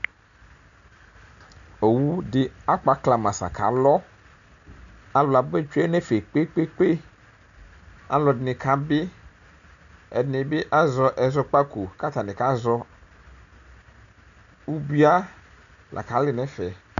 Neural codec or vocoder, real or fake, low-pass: none; real; 7.2 kHz